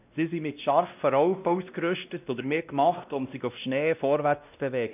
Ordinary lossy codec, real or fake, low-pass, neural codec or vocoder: none; fake; 3.6 kHz; codec, 16 kHz, 1 kbps, X-Codec, WavLM features, trained on Multilingual LibriSpeech